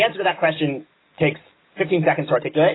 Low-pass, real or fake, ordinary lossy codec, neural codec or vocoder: 7.2 kHz; real; AAC, 16 kbps; none